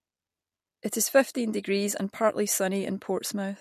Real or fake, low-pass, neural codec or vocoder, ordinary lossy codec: real; 14.4 kHz; none; MP3, 64 kbps